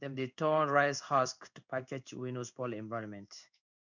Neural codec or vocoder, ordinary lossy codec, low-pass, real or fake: codec, 16 kHz in and 24 kHz out, 1 kbps, XY-Tokenizer; none; 7.2 kHz; fake